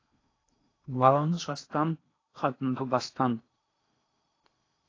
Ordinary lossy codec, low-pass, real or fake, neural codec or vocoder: AAC, 32 kbps; 7.2 kHz; fake; codec, 16 kHz in and 24 kHz out, 0.8 kbps, FocalCodec, streaming, 65536 codes